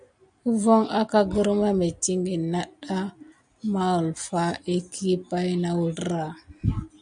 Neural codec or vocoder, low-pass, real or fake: none; 9.9 kHz; real